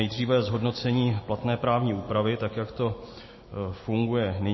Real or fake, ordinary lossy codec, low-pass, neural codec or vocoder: real; MP3, 24 kbps; 7.2 kHz; none